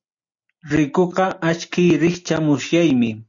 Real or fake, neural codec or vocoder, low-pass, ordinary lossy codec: real; none; 7.2 kHz; AAC, 64 kbps